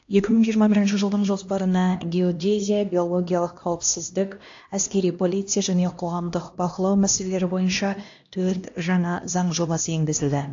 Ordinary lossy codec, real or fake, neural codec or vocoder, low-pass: AAC, 48 kbps; fake; codec, 16 kHz, 1 kbps, X-Codec, HuBERT features, trained on LibriSpeech; 7.2 kHz